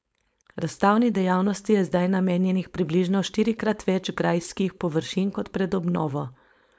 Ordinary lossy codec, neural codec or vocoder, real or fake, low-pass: none; codec, 16 kHz, 4.8 kbps, FACodec; fake; none